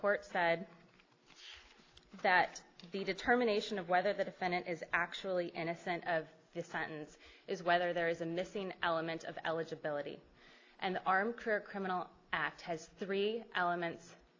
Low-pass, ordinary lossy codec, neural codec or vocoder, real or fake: 7.2 kHz; AAC, 32 kbps; none; real